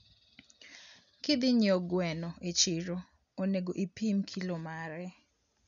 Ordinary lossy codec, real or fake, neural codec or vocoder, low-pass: none; real; none; 7.2 kHz